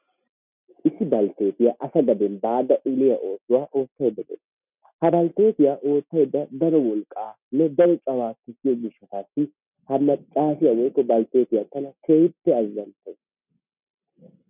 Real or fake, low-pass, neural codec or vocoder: real; 3.6 kHz; none